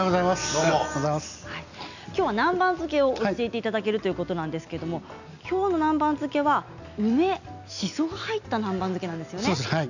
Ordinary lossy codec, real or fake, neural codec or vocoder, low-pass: none; real; none; 7.2 kHz